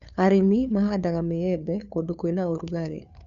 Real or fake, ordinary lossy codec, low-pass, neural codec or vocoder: fake; none; 7.2 kHz; codec, 16 kHz, 2 kbps, FunCodec, trained on Chinese and English, 25 frames a second